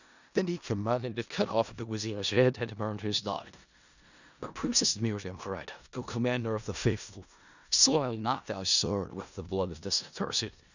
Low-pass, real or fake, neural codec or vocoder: 7.2 kHz; fake; codec, 16 kHz in and 24 kHz out, 0.4 kbps, LongCat-Audio-Codec, four codebook decoder